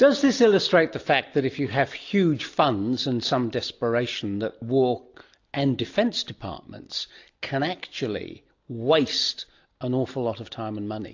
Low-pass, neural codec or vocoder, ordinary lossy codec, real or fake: 7.2 kHz; none; AAC, 48 kbps; real